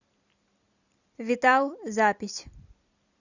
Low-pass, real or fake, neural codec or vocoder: 7.2 kHz; fake; vocoder, 44.1 kHz, 128 mel bands every 256 samples, BigVGAN v2